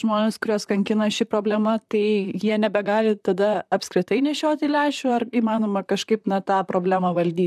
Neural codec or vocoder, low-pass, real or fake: vocoder, 44.1 kHz, 128 mel bands, Pupu-Vocoder; 14.4 kHz; fake